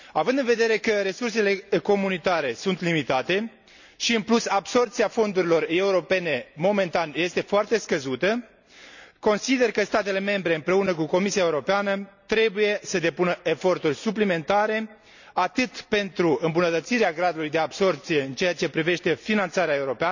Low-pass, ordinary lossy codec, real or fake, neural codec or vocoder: 7.2 kHz; none; real; none